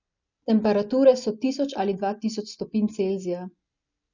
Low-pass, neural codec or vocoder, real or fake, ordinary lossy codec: 7.2 kHz; none; real; none